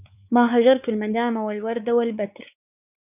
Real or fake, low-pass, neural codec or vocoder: fake; 3.6 kHz; codec, 16 kHz, 4 kbps, X-Codec, WavLM features, trained on Multilingual LibriSpeech